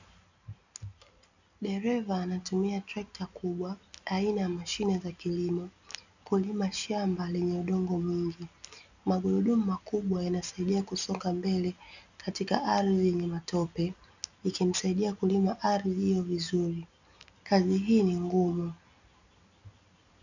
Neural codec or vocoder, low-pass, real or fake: none; 7.2 kHz; real